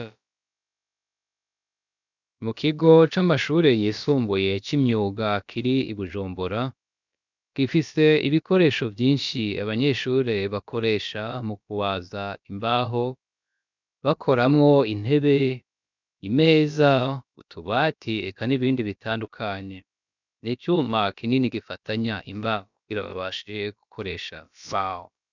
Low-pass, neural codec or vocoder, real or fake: 7.2 kHz; codec, 16 kHz, about 1 kbps, DyCAST, with the encoder's durations; fake